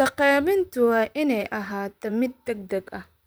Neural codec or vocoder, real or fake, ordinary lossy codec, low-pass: vocoder, 44.1 kHz, 128 mel bands, Pupu-Vocoder; fake; none; none